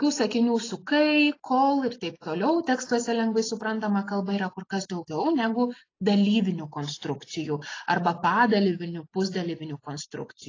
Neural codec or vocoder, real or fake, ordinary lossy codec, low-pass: none; real; AAC, 32 kbps; 7.2 kHz